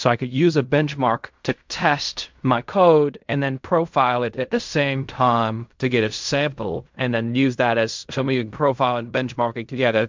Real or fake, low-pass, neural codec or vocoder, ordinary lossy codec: fake; 7.2 kHz; codec, 16 kHz in and 24 kHz out, 0.4 kbps, LongCat-Audio-Codec, fine tuned four codebook decoder; MP3, 64 kbps